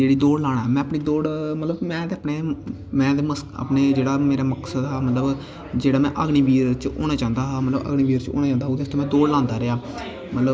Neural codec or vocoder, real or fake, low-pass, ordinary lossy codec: none; real; none; none